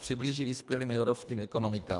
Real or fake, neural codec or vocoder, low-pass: fake; codec, 24 kHz, 1.5 kbps, HILCodec; 10.8 kHz